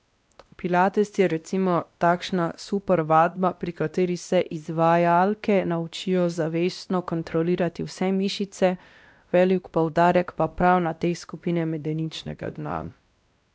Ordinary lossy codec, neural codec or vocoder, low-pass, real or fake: none; codec, 16 kHz, 1 kbps, X-Codec, WavLM features, trained on Multilingual LibriSpeech; none; fake